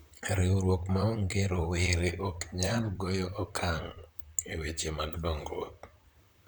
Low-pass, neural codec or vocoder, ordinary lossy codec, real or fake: none; vocoder, 44.1 kHz, 128 mel bands, Pupu-Vocoder; none; fake